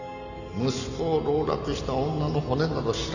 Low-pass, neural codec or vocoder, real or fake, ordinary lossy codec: 7.2 kHz; none; real; none